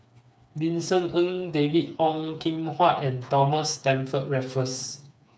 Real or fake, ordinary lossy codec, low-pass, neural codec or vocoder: fake; none; none; codec, 16 kHz, 4 kbps, FreqCodec, smaller model